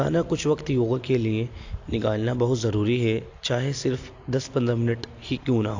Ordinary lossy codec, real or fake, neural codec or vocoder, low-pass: AAC, 48 kbps; real; none; 7.2 kHz